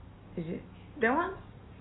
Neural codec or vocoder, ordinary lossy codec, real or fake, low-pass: none; AAC, 16 kbps; real; 7.2 kHz